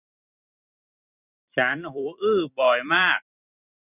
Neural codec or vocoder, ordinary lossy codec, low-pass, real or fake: vocoder, 44.1 kHz, 128 mel bands every 256 samples, BigVGAN v2; none; 3.6 kHz; fake